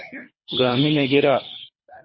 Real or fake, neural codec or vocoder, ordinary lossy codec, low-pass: fake; codec, 24 kHz, 3 kbps, HILCodec; MP3, 24 kbps; 7.2 kHz